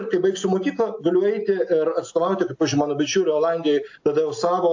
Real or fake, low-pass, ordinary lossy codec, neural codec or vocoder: real; 7.2 kHz; AAC, 48 kbps; none